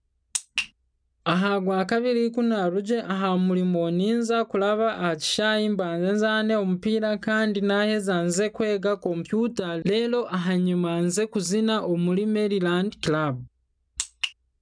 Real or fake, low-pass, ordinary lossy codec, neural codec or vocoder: real; 9.9 kHz; none; none